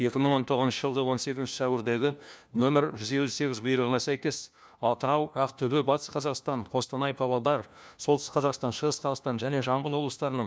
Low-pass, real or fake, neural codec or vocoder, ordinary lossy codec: none; fake; codec, 16 kHz, 1 kbps, FunCodec, trained on LibriTTS, 50 frames a second; none